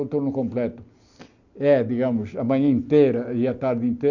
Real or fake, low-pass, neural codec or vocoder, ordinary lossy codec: real; 7.2 kHz; none; none